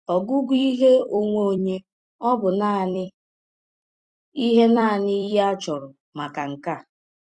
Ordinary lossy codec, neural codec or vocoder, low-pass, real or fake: Opus, 64 kbps; vocoder, 44.1 kHz, 128 mel bands every 256 samples, BigVGAN v2; 10.8 kHz; fake